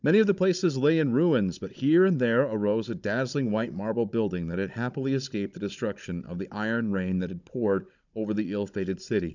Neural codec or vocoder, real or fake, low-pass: codec, 16 kHz, 16 kbps, FunCodec, trained on Chinese and English, 50 frames a second; fake; 7.2 kHz